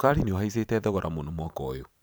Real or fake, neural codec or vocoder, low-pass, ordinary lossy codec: fake; vocoder, 44.1 kHz, 128 mel bands every 512 samples, BigVGAN v2; none; none